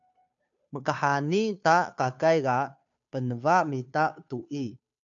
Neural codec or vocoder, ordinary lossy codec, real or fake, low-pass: codec, 16 kHz, 2 kbps, FunCodec, trained on Chinese and English, 25 frames a second; AAC, 64 kbps; fake; 7.2 kHz